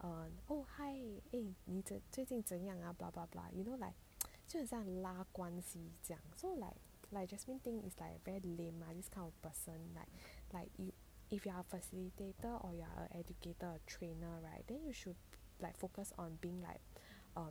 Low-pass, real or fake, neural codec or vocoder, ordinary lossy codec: none; real; none; none